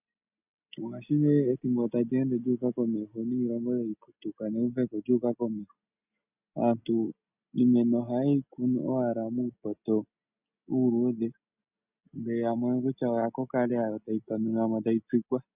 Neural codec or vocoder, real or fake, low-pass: none; real; 3.6 kHz